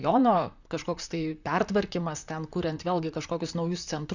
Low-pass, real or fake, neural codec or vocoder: 7.2 kHz; fake; vocoder, 44.1 kHz, 128 mel bands, Pupu-Vocoder